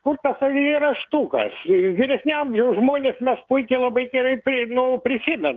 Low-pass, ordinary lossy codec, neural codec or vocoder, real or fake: 7.2 kHz; Opus, 32 kbps; codec, 16 kHz, 16 kbps, FreqCodec, smaller model; fake